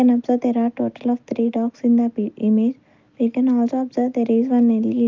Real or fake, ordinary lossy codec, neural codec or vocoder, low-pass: real; Opus, 24 kbps; none; 7.2 kHz